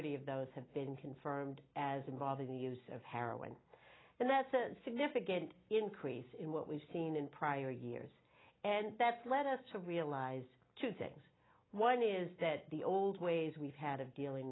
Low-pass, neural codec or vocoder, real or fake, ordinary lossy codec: 7.2 kHz; none; real; AAC, 16 kbps